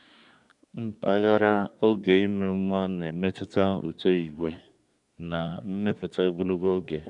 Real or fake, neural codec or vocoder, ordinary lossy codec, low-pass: fake; codec, 24 kHz, 1 kbps, SNAC; none; 10.8 kHz